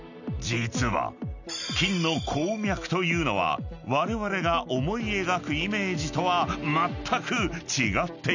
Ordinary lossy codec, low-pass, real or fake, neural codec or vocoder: none; 7.2 kHz; real; none